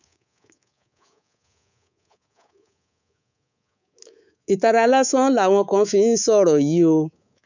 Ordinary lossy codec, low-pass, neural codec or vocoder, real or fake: none; 7.2 kHz; codec, 24 kHz, 3.1 kbps, DualCodec; fake